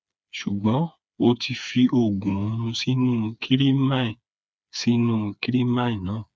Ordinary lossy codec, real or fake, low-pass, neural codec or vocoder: none; fake; none; codec, 16 kHz, 4 kbps, FreqCodec, smaller model